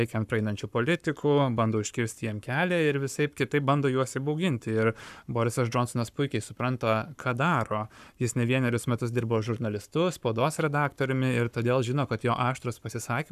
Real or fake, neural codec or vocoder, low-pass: fake; codec, 44.1 kHz, 7.8 kbps, Pupu-Codec; 14.4 kHz